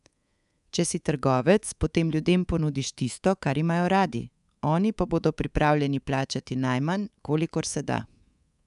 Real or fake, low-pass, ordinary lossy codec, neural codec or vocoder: fake; 10.8 kHz; none; codec, 24 kHz, 3.1 kbps, DualCodec